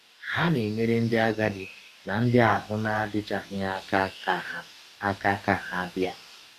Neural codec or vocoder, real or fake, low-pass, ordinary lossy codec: codec, 44.1 kHz, 2.6 kbps, DAC; fake; 14.4 kHz; none